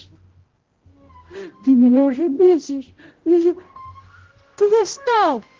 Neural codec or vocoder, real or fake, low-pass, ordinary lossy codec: codec, 16 kHz, 0.5 kbps, X-Codec, HuBERT features, trained on general audio; fake; 7.2 kHz; Opus, 16 kbps